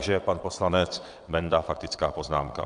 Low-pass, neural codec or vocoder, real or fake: 9.9 kHz; vocoder, 22.05 kHz, 80 mel bands, WaveNeXt; fake